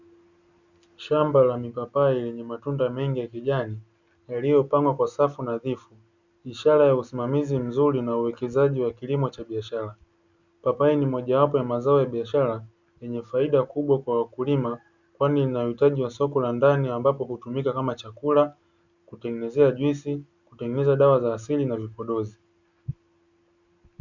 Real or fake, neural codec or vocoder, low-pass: real; none; 7.2 kHz